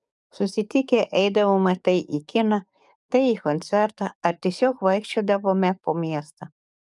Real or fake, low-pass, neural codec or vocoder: fake; 10.8 kHz; codec, 44.1 kHz, 7.8 kbps, DAC